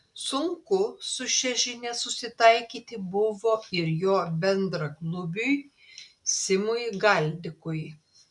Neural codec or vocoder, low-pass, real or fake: none; 10.8 kHz; real